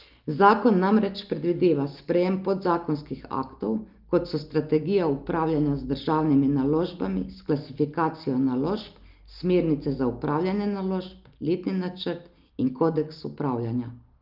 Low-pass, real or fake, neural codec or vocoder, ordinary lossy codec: 5.4 kHz; real; none; Opus, 24 kbps